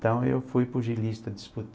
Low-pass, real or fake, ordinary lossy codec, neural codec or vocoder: none; real; none; none